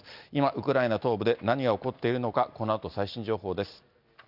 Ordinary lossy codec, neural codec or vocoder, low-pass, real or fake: none; none; 5.4 kHz; real